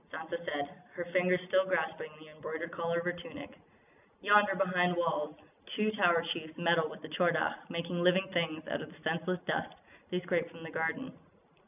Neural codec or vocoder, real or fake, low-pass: none; real; 3.6 kHz